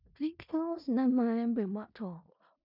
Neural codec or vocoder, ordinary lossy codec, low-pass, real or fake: codec, 16 kHz in and 24 kHz out, 0.4 kbps, LongCat-Audio-Codec, four codebook decoder; none; 5.4 kHz; fake